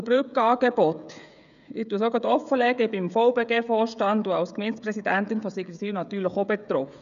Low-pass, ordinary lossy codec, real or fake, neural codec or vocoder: 7.2 kHz; none; fake; codec, 16 kHz, 16 kbps, FreqCodec, smaller model